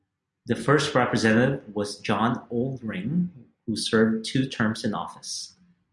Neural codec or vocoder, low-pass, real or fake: none; 10.8 kHz; real